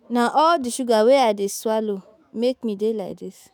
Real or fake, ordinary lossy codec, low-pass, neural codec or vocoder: fake; none; none; autoencoder, 48 kHz, 128 numbers a frame, DAC-VAE, trained on Japanese speech